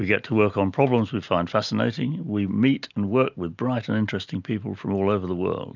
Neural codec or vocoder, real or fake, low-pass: none; real; 7.2 kHz